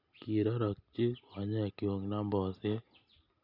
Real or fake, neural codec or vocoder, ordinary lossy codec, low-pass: real; none; none; 5.4 kHz